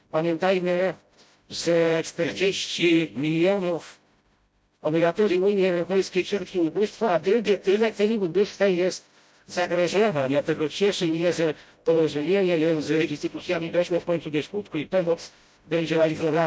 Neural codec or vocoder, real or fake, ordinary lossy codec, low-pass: codec, 16 kHz, 0.5 kbps, FreqCodec, smaller model; fake; none; none